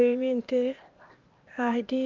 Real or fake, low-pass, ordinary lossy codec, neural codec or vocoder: fake; 7.2 kHz; Opus, 32 kbps; codec, 16 kHz, 0.8 kbps, ZipCodec